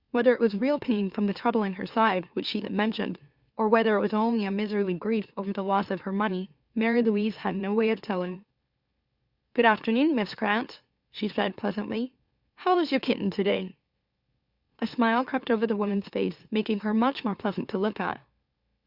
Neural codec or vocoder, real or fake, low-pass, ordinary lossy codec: autoencoder, 44.1 kHz, a latent of 192 numbers a frame, MeloTTS; fake; 5.4 kHz; Opus, 64 kbps